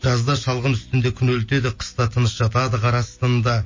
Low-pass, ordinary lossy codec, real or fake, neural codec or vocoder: 7.2 kHz; MP3, 32 kbps; real; none